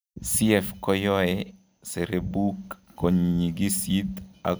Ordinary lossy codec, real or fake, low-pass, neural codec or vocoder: none; real; none; none